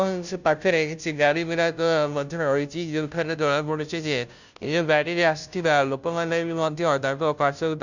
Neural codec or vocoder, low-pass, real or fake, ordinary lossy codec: codec, 16 kHz, 0.5 kbps, FunCodec, trained on Chinese and English, 25 frames a second; 7.2 kHz; fake; none